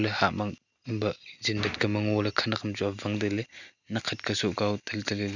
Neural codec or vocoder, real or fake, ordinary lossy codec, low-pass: none; real; none; 7.2 kHz